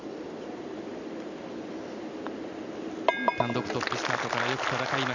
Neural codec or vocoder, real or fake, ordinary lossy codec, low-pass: none; real; none; 7.2 kHz